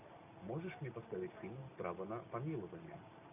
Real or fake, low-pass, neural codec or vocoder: real; 3.6 kHz; none